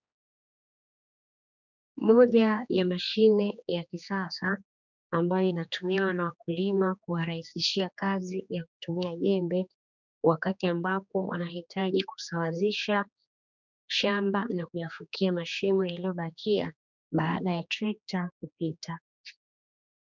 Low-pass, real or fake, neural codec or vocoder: 7.2 kHz; fake; codec, 16 kHz, 2 kbps, X-Codec, HuBERT features, trained on general audio